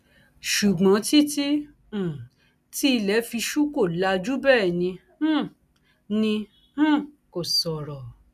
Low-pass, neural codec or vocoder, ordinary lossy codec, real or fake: 14.4 kHz; none; none; real